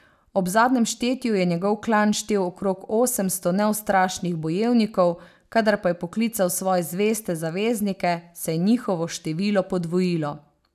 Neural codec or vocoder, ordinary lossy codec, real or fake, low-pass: none; none; real; 14.4 kHz